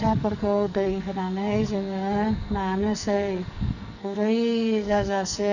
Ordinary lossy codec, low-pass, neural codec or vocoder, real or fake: none; 7.2 kHz; codec, 44.1 kHz, 2.6 kbps, SNAC; fake